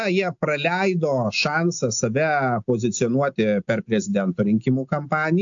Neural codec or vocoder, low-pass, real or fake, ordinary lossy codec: none; 7.2 kHz; real; AAC, 64 kbps